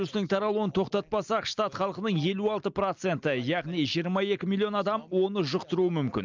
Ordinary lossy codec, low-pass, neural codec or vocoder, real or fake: Opus, 24 kbps; 7.2 kHz; none; real